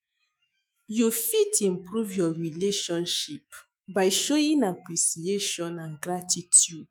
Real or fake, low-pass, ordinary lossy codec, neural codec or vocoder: fake; none; none; autoencoder, 48 kHz, 128 numbers a frame, DAC-VAE, trained on Japanese speech